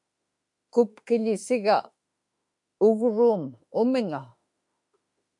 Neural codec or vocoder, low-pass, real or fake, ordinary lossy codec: autoencoder, 48 kHz, 32 numbers a frame, DAC-VAE, trained on Japanese speech; 10.8 kHz; fake; MP3, 64 kbps